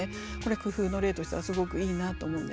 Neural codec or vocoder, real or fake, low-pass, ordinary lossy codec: none; real; none; none